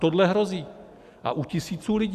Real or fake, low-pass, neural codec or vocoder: real; 14.4 kHz; none